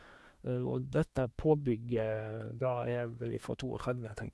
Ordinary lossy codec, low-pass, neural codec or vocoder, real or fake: none; none; codec, 24 kHz, 1 kbps, SNAC; fake